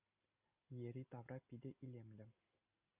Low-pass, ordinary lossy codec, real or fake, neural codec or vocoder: 3.6 kHz; Opus, 64 kbps; real; none